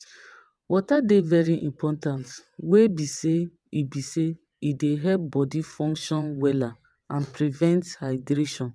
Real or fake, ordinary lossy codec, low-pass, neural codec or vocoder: fake; none; none; vocoder, 22.05 kHz, 80 mel bands, WaveNeXt